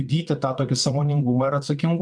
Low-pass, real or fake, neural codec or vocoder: 9.9 kHz; fake; vocoder, 22.05 kHz, 80 mel bands, WaveNeXt